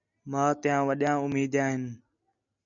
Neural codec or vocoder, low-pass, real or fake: none; 7.2 kHz; real